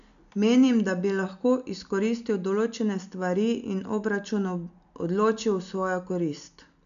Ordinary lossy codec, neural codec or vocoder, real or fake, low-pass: none; none; real; 7.2 kHz